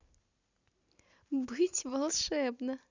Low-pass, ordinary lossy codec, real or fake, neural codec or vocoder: 7.2 kHz; Opus, 64 kbps; real; none